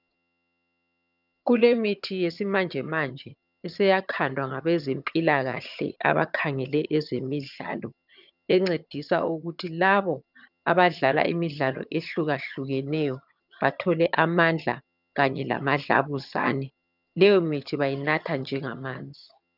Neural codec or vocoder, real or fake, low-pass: vocoder, 22.05 kHz, 80 mel bands, HiFi-GAN; fake; 5.4 kHz